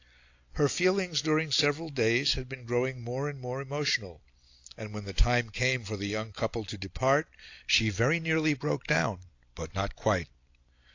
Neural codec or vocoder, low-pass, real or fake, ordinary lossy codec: none; 7.2 kHz; real; AAC, 48 kbps